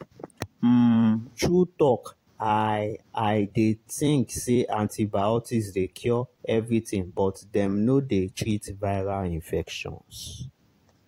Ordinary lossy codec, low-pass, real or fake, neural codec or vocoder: AAC, 48 kbps; 19.8 kHz; fake; vocoder, 44.1 kHz, 128 mel bands every 256 samples, BigVGAN v2